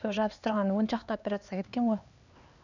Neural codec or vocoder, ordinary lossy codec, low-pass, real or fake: codec, 16 kHz, 2 kbps, X-Codec, HuBERT features, trained on LibriSpeech; none; 7.2 kHz; fake